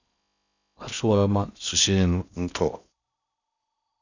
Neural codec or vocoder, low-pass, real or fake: codec, 16 kHz in and 24 kHz out, 0.6 kbps, FocalCodec, streaming, 4096 codes; 7.2 kHz; fake